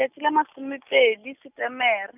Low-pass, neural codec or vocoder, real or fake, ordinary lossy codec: 3.6 kHz; none; real; none